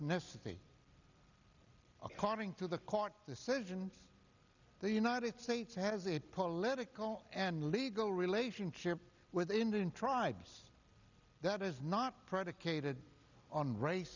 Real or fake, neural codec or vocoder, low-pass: real; none; 7.2 kHz